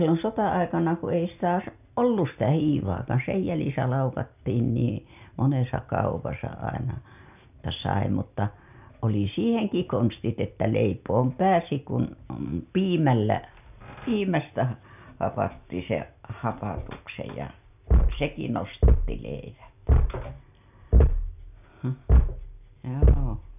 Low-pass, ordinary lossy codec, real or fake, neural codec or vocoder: 3.6 kHz; none; real; none